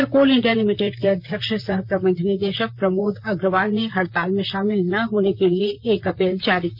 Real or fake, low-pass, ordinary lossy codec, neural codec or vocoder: fake; 5.4 kHz; none; vocoder, 44.1 kHz, 128 mel bands, Pupu-Vocoder